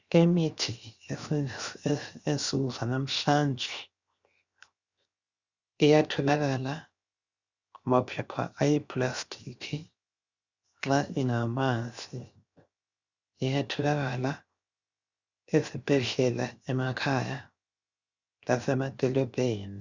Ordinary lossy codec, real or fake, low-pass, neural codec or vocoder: Opus, 64 kbps; fake; 7.2 kHz; codec, 16 kHz, 0.7 kbps, FocalCodec